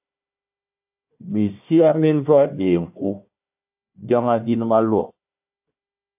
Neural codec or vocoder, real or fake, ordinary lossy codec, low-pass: codec, 16 kHz, 1 kbps, FunCodec, trained on Chinese and English, 50 frames a second; fake; AAC, 32 kbps; 3.6 kHz